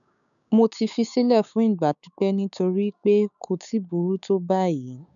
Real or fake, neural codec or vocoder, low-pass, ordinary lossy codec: fake; codec, 16 kHz, 6 kbps, DAC; 7.2 kHz; none